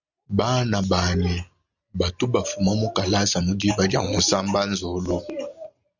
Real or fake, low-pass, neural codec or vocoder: real; 7.2 kHz; none